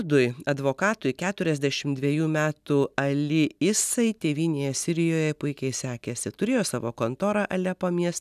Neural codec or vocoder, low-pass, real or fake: none; 14.4 kHz; real